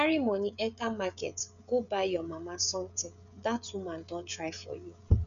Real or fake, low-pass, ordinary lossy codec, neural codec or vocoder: fake; 7.2 kHz; none; codec, 16 kHz, 16 kbps, FreqCodec, smaller model